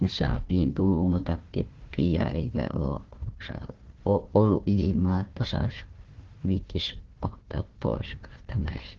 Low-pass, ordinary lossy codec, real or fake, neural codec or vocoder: 7.2 kHz; Opus, 16 kbps; fake; codec, 16 kHz, 1 kbps, FunCodec, trained on Chinese and English, 50 frames a second